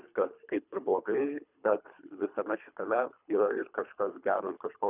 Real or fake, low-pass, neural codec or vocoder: fake; 3.6 kHz; codec, 24 kHz, 3 kbps, HILCodec